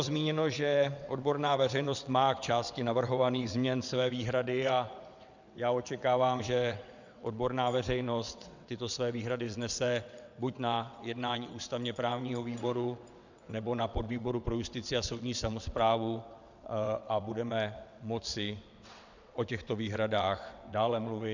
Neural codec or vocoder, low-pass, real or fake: vocoder, 22.05 kHz, 80 mel bands, WaveNeXt; 7.2 kHz; fake